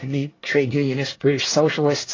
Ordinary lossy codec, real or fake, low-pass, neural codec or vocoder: AAC, 32 kbps; fake; 7.2 kHz; codec, 24 kHz, 1 kbps, SNAC